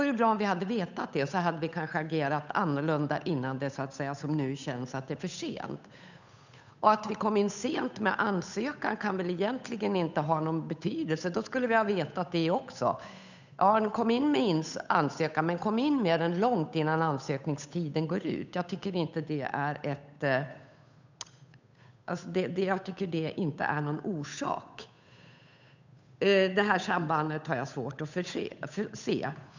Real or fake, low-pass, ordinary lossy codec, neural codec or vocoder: fake; 7.2 kHz; none; codec, 16 kHz, 8 kbps, FunCodec, trained on Chinese and English, 25 frames a second